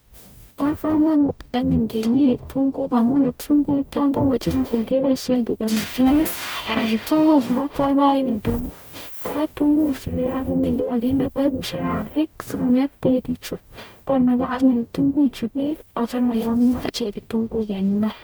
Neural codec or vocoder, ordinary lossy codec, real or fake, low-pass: codec, 44.1 kHz, 0.9 kbps, DAC; none; fake; none